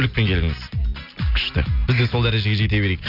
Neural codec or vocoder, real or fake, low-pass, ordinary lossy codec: none; real; 5.4 kHz; none